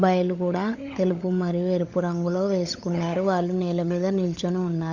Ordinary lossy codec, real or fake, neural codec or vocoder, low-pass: Opus, 64 kbps; fake; codec, 16 kHz, 16 kbps, FunCodec, trained on LibriTTS, 50 frames a second; 7.2 kHz